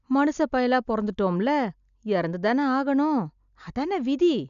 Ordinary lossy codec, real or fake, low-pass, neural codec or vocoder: none; real; 7.2 kHz; none